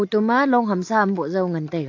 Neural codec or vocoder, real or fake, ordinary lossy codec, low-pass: none; real; AAC, 48 kbps; 7.2 kHz